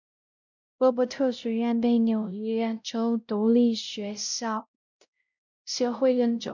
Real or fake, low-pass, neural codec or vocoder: fake; 7.2 kHz; codec, 16 kHz, 0.5 kbps, X-Codec, WavLM features, trained on Multilingual LibriSpeech